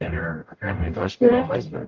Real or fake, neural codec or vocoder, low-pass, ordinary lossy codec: fake; codec, 44.1 kHz, 0.9 kbps, DAC; 7.2 kHz; Opus, 32 kbps